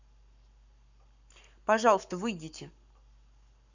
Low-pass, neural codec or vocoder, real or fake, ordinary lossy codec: 7.2 kHz; codec, 44.1 kHz, 7.8 kbps, Pupu-Codec; fake; none